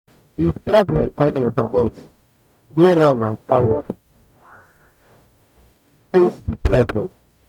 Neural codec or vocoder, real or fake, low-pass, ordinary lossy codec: codec, 44.1 kHz, 0.9 kbps, DAC; fake; 19.8 kHz; none